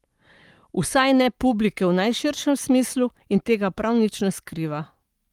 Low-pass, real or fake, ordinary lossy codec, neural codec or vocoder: 19.8 kHz; fake; Opus, 32 kbps; codec, 44.1 kHz, 7.8 kbps, Pupu-Codec